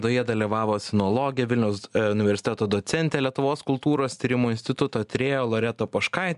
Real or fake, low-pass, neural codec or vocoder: real; 10.8 kHz; none